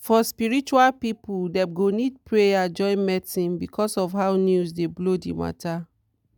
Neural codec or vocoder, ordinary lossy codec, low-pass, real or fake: none; none; 19.8 kHz; real